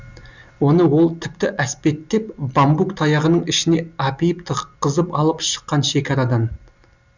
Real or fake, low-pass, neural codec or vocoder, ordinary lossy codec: real; 7.2 kHz; none; Opus, 64 kbps